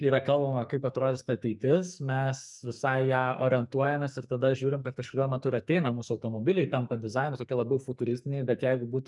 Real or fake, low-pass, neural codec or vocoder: fake; 10.8 kHz; codec, 44.1 kHz, 2.6 kbps, SNAC